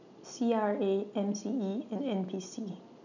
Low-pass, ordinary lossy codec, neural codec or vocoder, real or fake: 7.2 kHz; none; none; real